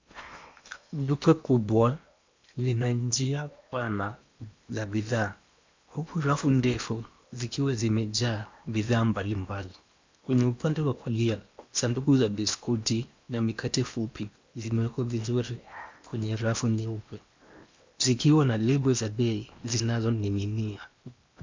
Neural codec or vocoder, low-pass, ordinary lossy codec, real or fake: codec, 16 kHz in and 24 kHz out, 0.8 kbps, FocalCodec, streaming, 65536 codes; 7.2 kHz; MP3, 64 kbps; fake